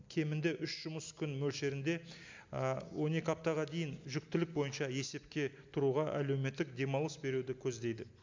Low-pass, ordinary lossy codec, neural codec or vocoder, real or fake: 7.2 kHz; MP3, 64 kbps; none; real